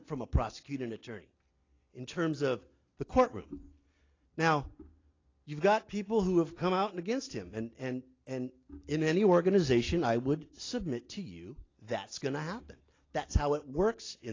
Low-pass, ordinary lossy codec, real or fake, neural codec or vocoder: 7.2 kHz; AAC, 32 kbps; real; none